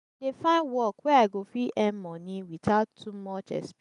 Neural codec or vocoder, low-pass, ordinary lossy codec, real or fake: none; 10.8 kHz; none; real